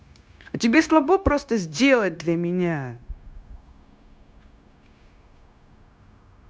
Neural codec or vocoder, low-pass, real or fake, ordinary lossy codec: codec, 16 kHz, 0.9 kbps, LongCat-Audio-Codec; none; fake; none